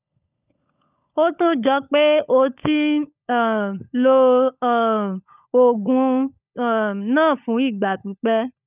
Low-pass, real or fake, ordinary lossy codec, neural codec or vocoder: 3.6 kHz; fake; none; codec, 16 kHz, 16 kbps, FunCodec, trained on LibriTTS, 50 frames a second